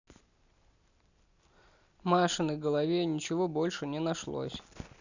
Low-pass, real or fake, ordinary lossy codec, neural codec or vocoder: 7.2 kHz; real; none; none